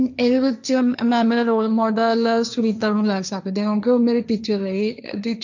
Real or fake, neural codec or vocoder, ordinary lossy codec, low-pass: fake; codec, 16 kHz, 1.1 kbps, Voila-Tokenizer; none; 7.2 kHz